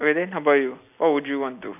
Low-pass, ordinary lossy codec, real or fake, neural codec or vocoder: 3.6 kHz; none; real; none